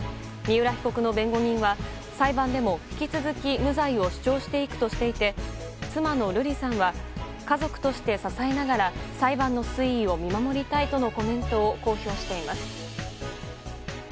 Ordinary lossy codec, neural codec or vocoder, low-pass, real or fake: none; none; none; real